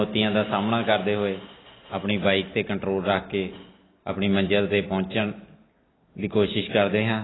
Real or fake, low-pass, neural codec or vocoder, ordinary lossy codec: real; 7.2 kHz; none; AAC, 16 kbps